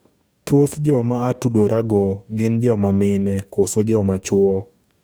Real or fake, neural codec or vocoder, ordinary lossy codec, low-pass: fake; codec, 44.1 kHz, 2.6 kbps, DAC; none; none